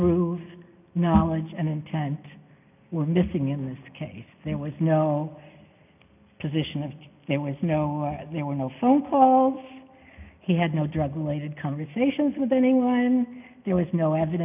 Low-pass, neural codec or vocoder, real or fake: 3.6 kHz; none; real